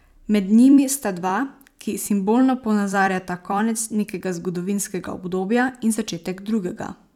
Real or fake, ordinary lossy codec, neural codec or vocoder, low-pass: fake; none; vocoder, 44.1 kHz, 128 mel bands every 256 samples, BigVGAN v2; 19.8 kHz